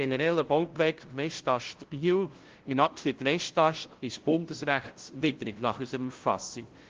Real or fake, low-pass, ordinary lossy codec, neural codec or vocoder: fake; 7.2 kHz; Opus, 16 kbps; codec, 16 kHz, 0.5 kbps, FunCodec, trained on LibriTTS, 25 frames a second